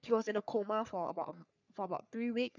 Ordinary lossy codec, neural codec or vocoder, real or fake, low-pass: none; codec, 44.1 kHz, 3.4 kbps, Pupu-Codec; fake; 7.2 kHz